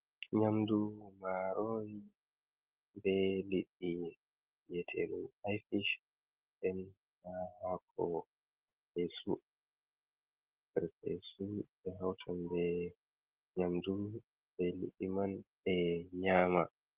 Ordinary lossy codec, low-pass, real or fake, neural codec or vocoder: Opus, 16 kbps; 3.6 kHz; real; none